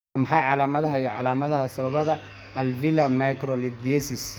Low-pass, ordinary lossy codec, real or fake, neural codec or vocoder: none; none; fake; codec, 44.1 kHz, 2.6 kbps, SNAC